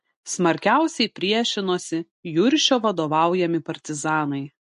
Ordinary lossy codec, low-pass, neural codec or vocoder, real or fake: MP3, 48 kbps; 10.8 kHz; none; real